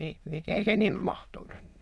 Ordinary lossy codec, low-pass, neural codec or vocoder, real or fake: none; none; autoencoder, 22.05 kHz, a latent of 192 numbers a frame, VITS, trained on many speakers; fake